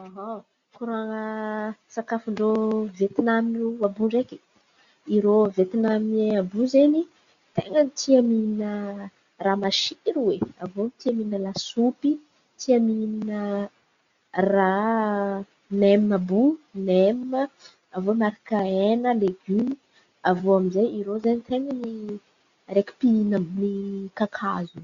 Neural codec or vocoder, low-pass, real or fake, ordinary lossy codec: none; 7.2 kHz; real; Opus, 64 kbps